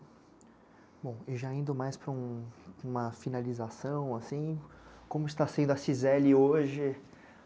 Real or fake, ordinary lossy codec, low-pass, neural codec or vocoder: real; none; none; none